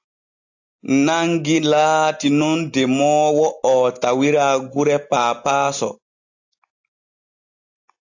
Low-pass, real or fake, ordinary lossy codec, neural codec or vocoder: 7.2 kHz; real; AAC, 48 kbps; none